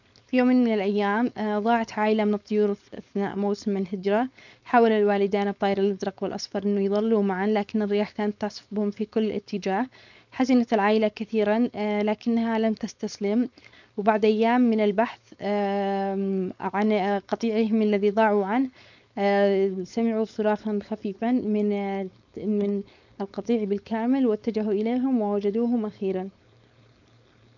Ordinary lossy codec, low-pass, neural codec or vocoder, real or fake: none; 7.2 kHz; codec, 16 kHz, 4.8 kbps, FACodec; fake